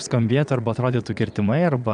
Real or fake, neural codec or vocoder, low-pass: fake; vocoder, 22.05 kHz, 80 mel bands, Vocos; 9.9 kHz